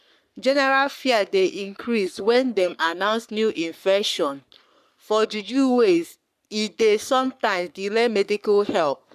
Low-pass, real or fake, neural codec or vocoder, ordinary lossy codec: 14.4 kHz; fake; codec, 44.1 kHz, 3.4 kbps, Pupu-Codec; none